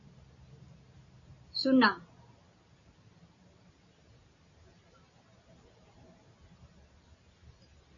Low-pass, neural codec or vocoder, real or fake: 7.2 kHz; none; real